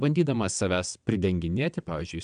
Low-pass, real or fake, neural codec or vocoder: 9.9 kHz; fake; vocoder, 22.05 kHz, 80 mel bands, WaveNeXt